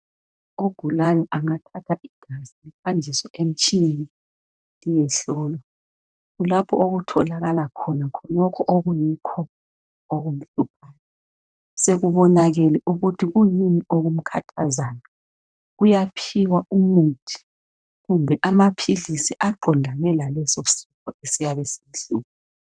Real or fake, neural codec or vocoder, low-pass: fake; vocoder, 44.1 kHz, 128 mel bands, Pupu-Vocoder; 9.9 kHz